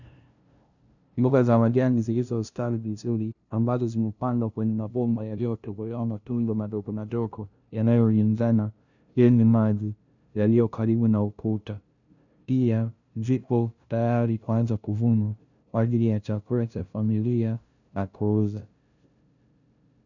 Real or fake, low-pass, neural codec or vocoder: fake; 7.2 kHz; codec, 16 kHz, 0.5 kbps, FunCodec, trained on LibriTTS, 25 frames a second